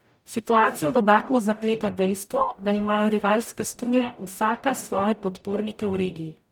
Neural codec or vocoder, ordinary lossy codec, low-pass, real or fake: codec, 44.1 kHz, 0.9 kbps, DAC; none; none; fake